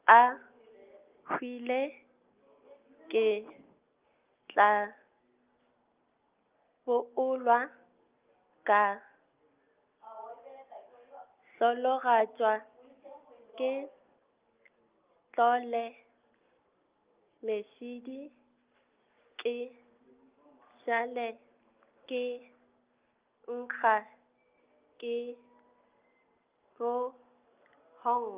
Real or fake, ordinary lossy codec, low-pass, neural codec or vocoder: real; Opus, 24 kbps; 3.6 kHz; none